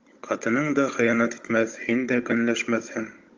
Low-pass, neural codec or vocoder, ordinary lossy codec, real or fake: 7.2 kHz; codec, 16 kHz, 16 kbps, FunCodec, trained on Chinese and English, 50 frames a second; Opus, 32 kbps; fake